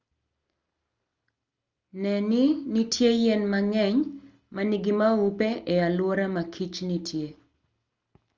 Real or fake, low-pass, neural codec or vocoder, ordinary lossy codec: real; 7.2 kHz; none; Opus, 32 kbps